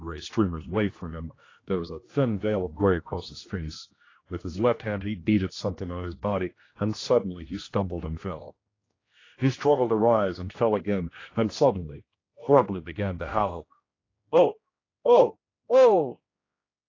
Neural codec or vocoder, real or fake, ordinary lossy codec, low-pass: codec, 16 kHz, 1 kbps, X-Codec, HuBERT features, trained on general audio; fake; AAC, 32 kbps; 7.2 kHz